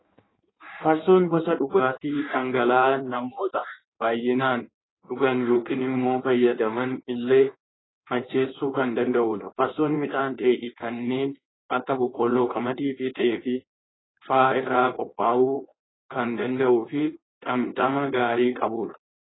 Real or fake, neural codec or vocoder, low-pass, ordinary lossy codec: fake; codec, 16 kHz in and 24 kHz out, 1.1 kbps, FireRedTTS-2 codec; 7.2 kHz; AAC, 16 kbps